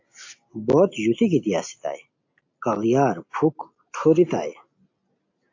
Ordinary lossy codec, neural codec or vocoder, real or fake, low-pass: AAC, 48 kbps; none; real; 7.2 kHz